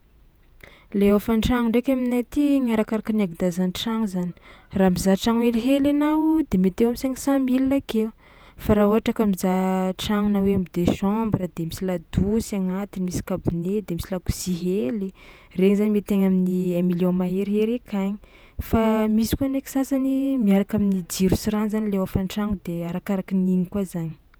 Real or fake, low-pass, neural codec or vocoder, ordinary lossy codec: fake; none; vocoder, 48 kHz, 128 mel bands, Vocos; none